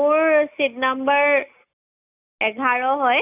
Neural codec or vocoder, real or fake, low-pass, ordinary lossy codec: none; real; 3.6 kHz; none